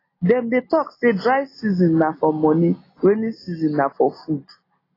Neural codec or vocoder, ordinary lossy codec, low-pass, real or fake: none; AAC, 24 kbps; 5.4 kHz; real